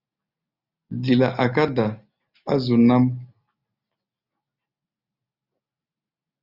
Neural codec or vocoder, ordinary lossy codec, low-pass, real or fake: none; Opus, 64 kbps; 5.4 kHz; real